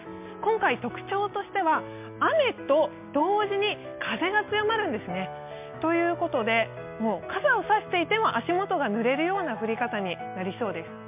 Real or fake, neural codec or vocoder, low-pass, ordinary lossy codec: real; none; 3.6 kHz; MP3, 32 kbps